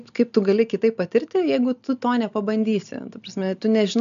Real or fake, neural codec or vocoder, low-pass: real; none; 7.2 kHz